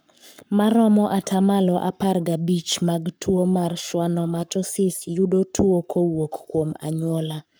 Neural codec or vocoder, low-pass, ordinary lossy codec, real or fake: codec, 44.1 kHz, 7.8 kbps, Pupu-Codec; none; none; fake